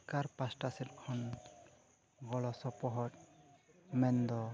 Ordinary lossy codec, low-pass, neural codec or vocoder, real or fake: none; none; none; real